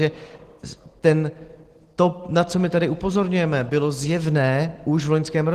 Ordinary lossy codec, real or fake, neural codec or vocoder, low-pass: Opus, 16 kbps; real; none; 14.4 kHz